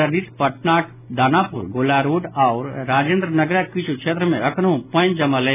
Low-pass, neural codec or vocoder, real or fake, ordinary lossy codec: 3.6 kHz; none; real; none